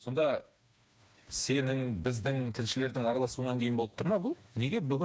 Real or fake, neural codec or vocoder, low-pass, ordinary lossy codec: fake; codec, 16 kHz, 2 kbps, FreqCodec, smaller model; none; none